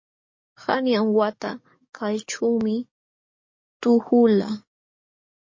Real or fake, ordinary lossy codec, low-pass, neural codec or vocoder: fake; MP3, 32 kbps; 7.2 kHz; codec, 44.1 kHz, 7.8 kbps, DAC